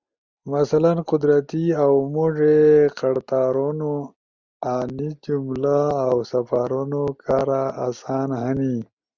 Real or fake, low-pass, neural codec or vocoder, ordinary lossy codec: real; 7.2 kHz; none; Opus, 64 kbps